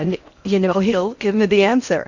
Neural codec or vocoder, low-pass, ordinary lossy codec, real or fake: codec, 16 kHz in and 24 kHz out, 0.8 kbps, FocalCodec, streaming, 65536 codes; 7.2 kHz; Opus, 64 kbps; fake